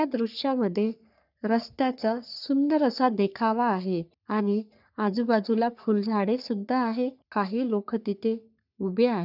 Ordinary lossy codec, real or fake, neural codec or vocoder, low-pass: none; fake; codec, 44.1 kHz, 3.4 kbps, Pupu-Codec; 5.4 kHz